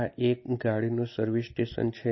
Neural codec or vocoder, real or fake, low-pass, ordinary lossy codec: none; real; 7.2 kHz; MP3, 24 kbps